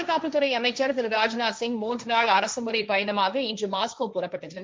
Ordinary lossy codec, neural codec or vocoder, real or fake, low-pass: none; codec, 16 kHz, 1.1 kbps, Voila-Tokenizer; fake; none